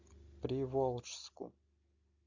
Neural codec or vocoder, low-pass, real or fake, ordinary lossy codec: none; 7.2 kHz; real; MP3, 64 kbps